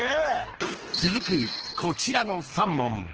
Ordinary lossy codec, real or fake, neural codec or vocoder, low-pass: Opus, 16 kbps; fake; codec, 24 kHz, 3 kbps, HILCodec; 7.2 kHz